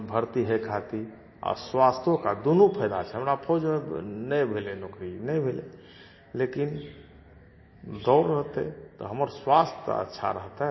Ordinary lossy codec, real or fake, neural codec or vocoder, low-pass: MP3, 24 kbps; real; none; 7.2 kHz